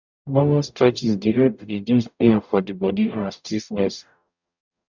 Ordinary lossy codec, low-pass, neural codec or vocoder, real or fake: none; 7.2 kHz; codec, 44.1 kHz, 0.9 kbps, DAC; fake